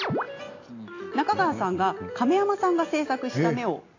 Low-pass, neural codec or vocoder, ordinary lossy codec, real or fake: 7.2 kHz; none; AAC, 32 kbps; real